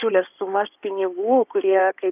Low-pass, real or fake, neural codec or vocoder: 3.6 kHz; fake; codec, 16 kHz in and 24 kHz out, 2.2 kbps, FireRedTTS-2 codec